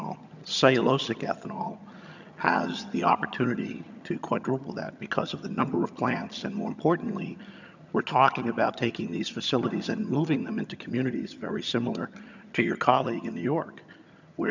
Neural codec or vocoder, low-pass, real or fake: vocoder, 22.05 kHz, 80 mel bands, HiFi-GAN; 7.2 kHz; fake